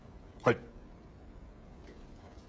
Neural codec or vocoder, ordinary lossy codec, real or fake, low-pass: codec, 16 kHz, 16 kbps, FreqCodec, smaller model; none; fake; none